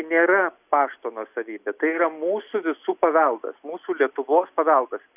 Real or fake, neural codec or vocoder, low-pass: real; none; 3.6 kHz